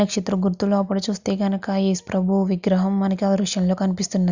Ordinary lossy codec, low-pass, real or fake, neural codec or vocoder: Opus, 64 kbps; 7.2 kHz; real; none